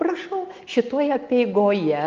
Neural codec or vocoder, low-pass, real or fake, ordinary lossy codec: none; 7.2 kHz; real; Opus, 32 kbps